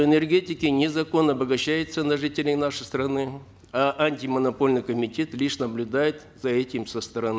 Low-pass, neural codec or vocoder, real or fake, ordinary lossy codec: none; none; real; none